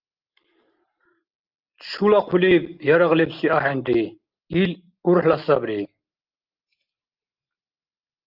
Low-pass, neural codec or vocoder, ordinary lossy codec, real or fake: 5.4 kHz; none; Opus, 24 kbps; real